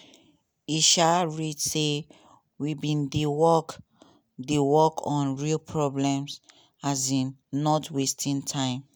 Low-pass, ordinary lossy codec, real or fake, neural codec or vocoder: none; none; real; none